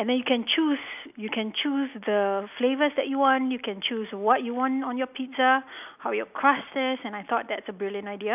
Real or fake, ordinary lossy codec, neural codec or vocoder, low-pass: real; none; none; 3.6 kHz